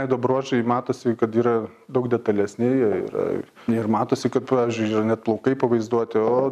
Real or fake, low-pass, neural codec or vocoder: real; 14.4 kHz; none